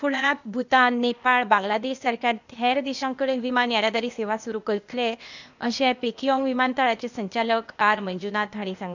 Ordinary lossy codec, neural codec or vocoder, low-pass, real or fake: none; codec, 16 kHz, 0.8 kbps, ZipCodec; 7.2 kHz; fake